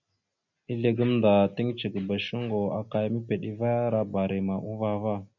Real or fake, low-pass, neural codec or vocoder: real; 7.2 kHz; none